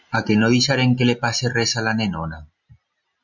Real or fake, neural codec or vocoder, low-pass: real; none; 7.2 kHz